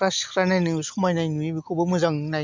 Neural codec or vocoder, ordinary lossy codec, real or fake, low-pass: none; MP3, 64 kbps; real; 7.2 kHz